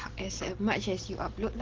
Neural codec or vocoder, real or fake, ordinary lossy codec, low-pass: none; real; Opus, 16 kbps; 7.2 kHz